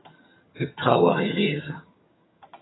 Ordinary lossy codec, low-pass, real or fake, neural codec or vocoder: AAC, 16 kbps; 7.2 kHz; fake; vocoder, 22.05 kHz, 80 mel bands, HiFi-GAN